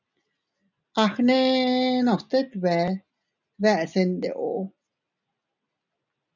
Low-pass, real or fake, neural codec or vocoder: 7.2 kHz; real; none